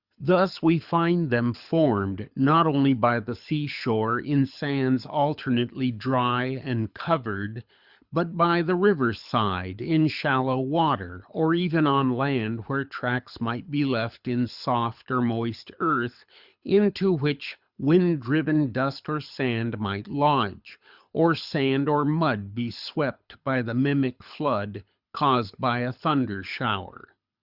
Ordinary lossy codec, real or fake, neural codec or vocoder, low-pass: Opus, 64 kbps; fake; codec, 24 kHz, 6 kbps, HILCodec; 5.4 kHz